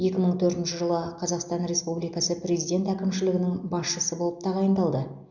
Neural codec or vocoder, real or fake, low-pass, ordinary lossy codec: none; real; 7.2 kHz; none